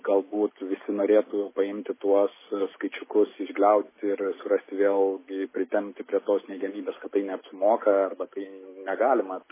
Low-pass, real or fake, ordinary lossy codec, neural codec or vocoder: 3.6 kHz; real; MP3, 16 kbps; none